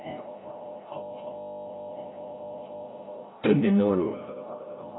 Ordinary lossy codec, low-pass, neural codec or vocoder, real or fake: AAC, 16 kbps; 7.2 kHz; codec, 16 kHz, 0.5 kbps, FreqCodec, larger model; fake